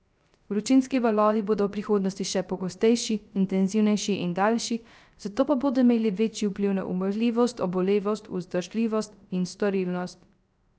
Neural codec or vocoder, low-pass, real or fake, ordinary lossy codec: codec, 16 kHz, 0.3 kbps, FocalCodec; none; fake; none